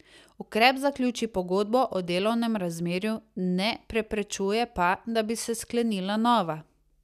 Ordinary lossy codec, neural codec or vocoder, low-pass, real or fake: none; none; 14.4 kHz; real